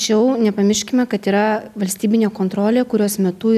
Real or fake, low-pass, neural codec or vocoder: real; 14.4 kHz; none